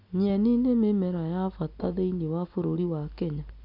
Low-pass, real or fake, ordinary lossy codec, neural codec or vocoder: 5.4 kHz; real; none; none